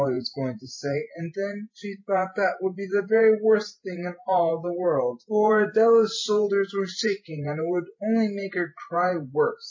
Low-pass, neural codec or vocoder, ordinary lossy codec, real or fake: 7.2 kHz; vocoder, 44.1 kHz, 128 mel bands every 512 samples, BigVGAN v2; MP3, 32 kbps; fake